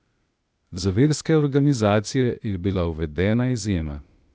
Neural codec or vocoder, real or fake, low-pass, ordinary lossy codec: codec, 16 kHz, 0.8 kbps, ZipCodec; fake; none; none